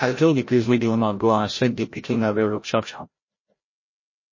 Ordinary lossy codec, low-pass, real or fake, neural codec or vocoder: MP3, 32 kbps; 7.2 kHz; fake; codec, 16 kHz, 0.5 kbps, FreqCodec, larger model